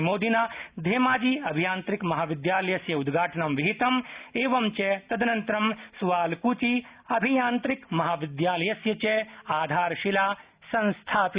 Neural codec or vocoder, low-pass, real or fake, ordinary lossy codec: none; 3.6 kHz; real; Opus, 64 kbps